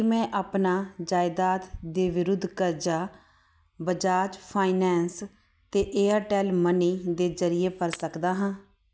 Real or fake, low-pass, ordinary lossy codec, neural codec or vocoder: real; none; none; none